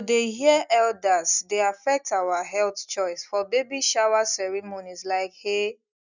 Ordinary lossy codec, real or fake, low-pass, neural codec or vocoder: none; real; 7.2 kHz; none